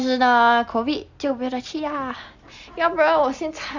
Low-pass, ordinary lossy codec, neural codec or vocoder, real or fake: 7.2 kHz; Opus, 64 kbps; none; real